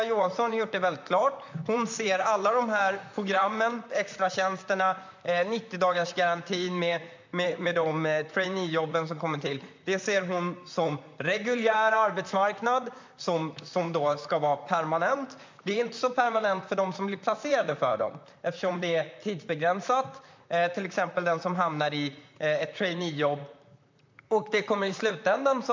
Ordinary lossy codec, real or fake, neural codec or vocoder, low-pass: MP3, 64 kbps; fake; vocoder, 44.1 kHz, 128 mel bands, Pupu-Vocoder; 7.2 kHz